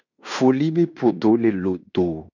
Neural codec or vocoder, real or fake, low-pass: codec, 16 kHz in and 24 kHz out, 0.9 kbps, LongCat-Audio-Codec, fine tuned four codebook decoder; fake; 7.2 kHz